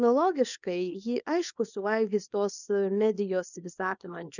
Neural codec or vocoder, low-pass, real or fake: codec, 24 kHz, 0.9 kbps, WavTokenizer, small release; 7.2 kHz; fake